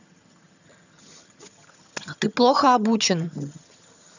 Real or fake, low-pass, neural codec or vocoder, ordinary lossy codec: fake; 7.2 kHz; vocoder, 22.05 kHz, 80 mel bands, HiFi-GAN; none